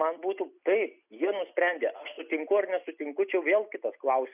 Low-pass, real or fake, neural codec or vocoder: 3.6 kHz; real; none